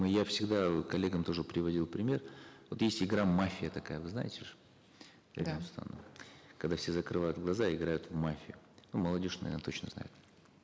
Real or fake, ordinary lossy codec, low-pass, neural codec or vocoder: real; none; none; none